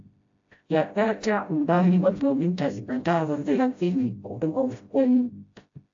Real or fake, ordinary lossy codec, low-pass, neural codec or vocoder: fake; AAC, 48 kbps; 7.2 kHz; codec, 16 kHz, 0.5 kbps, FreqCodec, smaller model